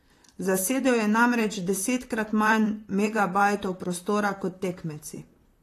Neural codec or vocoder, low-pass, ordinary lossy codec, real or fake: vocoder, 44.1 kHz, 128 mel bands, Pupu-Vocoder; 14.4 kHz; AAC, 48 kbps; fake